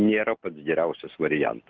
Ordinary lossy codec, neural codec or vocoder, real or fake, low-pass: Opus, 24 kbps; none; real; 7.2 kHz